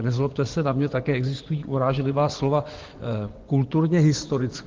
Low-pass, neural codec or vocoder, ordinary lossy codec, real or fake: 7.2 kHz; vocoder, 24 kHz, 100 mel bands, Vocos; Opus, 16 kbps; fake